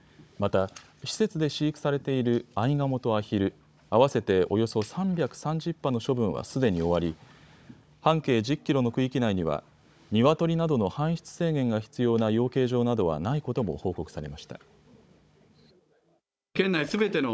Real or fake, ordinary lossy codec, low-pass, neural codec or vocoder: fake; none; none; codec, 16 kHz, 16 kbps, FunCodec, trained on Chinese and English, 50 frames a second